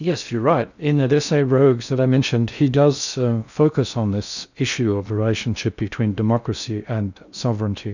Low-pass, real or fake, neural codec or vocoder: 7.2 kHz; fake; codec, 16 kHz in and 24 kHz out, 0.6 kbps, FocalCodec, streaming, 4096 codes